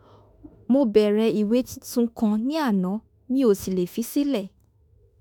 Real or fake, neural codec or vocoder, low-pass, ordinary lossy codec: fake; autoencoder, 48 kHz, 32 numbers a frame, DAC-VAE, trained on Japanese speech; none; none